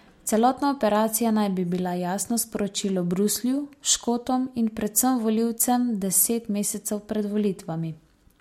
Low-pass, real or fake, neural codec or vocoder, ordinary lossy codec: 19.8 kHz; real; none; MP3, 64 kbps